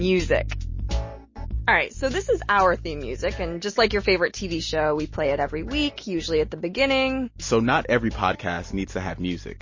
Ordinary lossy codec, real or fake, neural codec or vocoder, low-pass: MP3, 32 kbps; real; none; 7.2 kHz